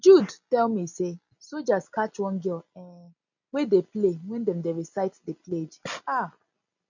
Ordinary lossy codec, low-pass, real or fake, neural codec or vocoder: none; 7.2 kHz; real; none